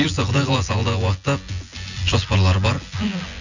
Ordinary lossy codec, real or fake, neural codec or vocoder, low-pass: none; fake; vocoder, 24 kHz, 100 mel bands, Vocos; 7.2 kHz